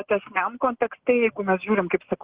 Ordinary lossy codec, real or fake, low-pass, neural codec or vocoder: Opus, 16 kbps; real; 3.6 kHz; none